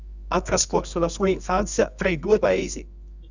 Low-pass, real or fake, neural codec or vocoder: 7.2 kHz; fake; codec, 24 kHz, 0.9 kbps, WavTokenizer, medium music audio release